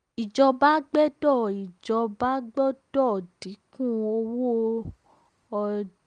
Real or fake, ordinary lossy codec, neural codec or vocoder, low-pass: real; Opus, 32 kbps; none; 9.9 kHz